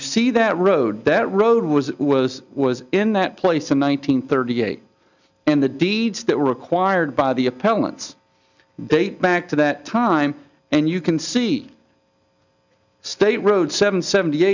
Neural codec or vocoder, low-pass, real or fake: none; 7.2 kHz; real